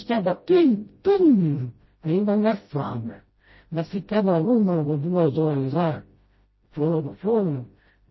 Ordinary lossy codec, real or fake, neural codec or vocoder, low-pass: MP3, 24 kbps; fake; codec, 16 kHz, 0.5 kbps, FreqCodec, smaller model; 7.2 kHz